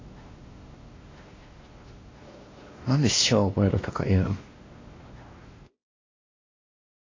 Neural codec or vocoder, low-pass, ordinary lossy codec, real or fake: codec, 16 kHz, 1 kbps, X-Codec, WavLM features, trained on Multilingual LibriSpeech; 7.2 kHz; AAC, 32 kbps; fake